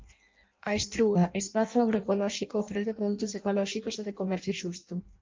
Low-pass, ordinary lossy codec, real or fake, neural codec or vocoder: 7.2 kHz; Opus, 24 kbps; fake; codec, 16 kHz in and 24 kHz out, 0.6 kbps, FireRedTTS-2 codec